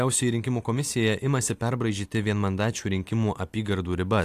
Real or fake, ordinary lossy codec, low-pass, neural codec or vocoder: real; AAC, 64 kbps; 14.4 kHz; none